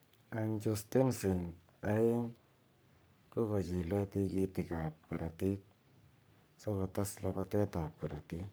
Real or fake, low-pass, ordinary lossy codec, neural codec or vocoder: fake; none; none; codec, 44.1 kHz, 3.4 kbps, Pupu-Codec